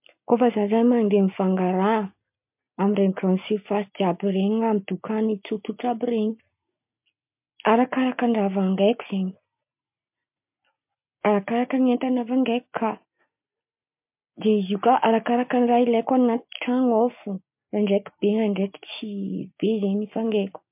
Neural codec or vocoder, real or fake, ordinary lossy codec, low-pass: none; real; MP3, 32 kbps; 3.6 kHz